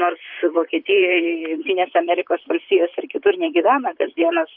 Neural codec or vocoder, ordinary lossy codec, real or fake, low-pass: vocoder, 44.1 kHz, 128 mel bands, Pupu-Vocoder; Opus, 64 kbps; fake; 5.4 kHz